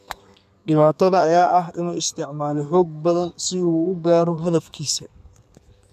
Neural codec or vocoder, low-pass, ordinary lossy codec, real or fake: codec, 32 kHz, 1.9 kbps, SNAC; 14.4 kHz; none; fake